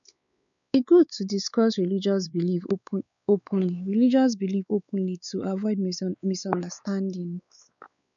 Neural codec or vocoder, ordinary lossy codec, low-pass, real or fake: codec, 16 kHz, 4 kbps, X-Codec, WavLM features, trained on Multilingual LibriSpeech; none; 7.2 kHz; fake